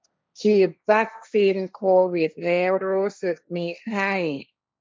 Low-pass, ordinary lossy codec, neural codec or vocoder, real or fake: none; none; codec, 16 kHz, 1.1 kbps, Voila-Tokenizer; fake